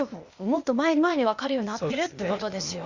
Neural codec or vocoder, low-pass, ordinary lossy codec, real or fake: codec, 16 kHz, 0.8 kbps, ZipCodec; 7.2 kHz; Opus, 64 kbps; fake